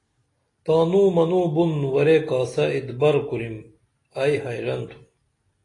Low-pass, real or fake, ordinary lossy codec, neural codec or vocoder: 10.8 kHz; real; AAC, 32 kbps; none